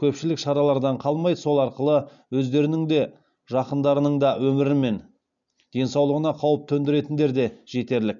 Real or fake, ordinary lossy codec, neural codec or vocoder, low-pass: real; none; none; 7.2 kHz